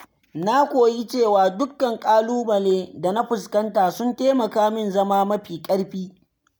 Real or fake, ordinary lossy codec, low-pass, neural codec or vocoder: real; none; none; none